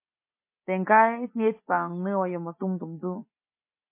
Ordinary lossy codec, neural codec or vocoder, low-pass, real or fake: MP3, 24 kbps; none; 3.6 kHz; real